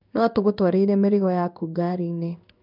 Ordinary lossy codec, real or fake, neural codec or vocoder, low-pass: none; fake; codec, 16 kHz in and 24 kHz out, 1 kbps, XY-Tokenizer; 5.4 kHz